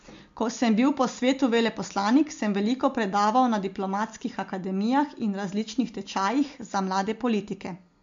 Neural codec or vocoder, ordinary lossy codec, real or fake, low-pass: none; MP3, 48 kbps; real; 7.2 kHz